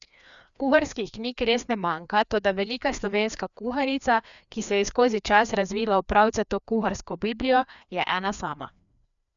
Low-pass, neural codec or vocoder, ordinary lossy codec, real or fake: 7.2 kHz; codec, 16 kHz, 2 kbps, FreqCodec, larger model; none; fake